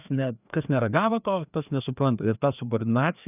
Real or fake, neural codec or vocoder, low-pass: fake; codec, 16 kHz, 2 kbps, FreqCodec, larger model; 3.6 kHz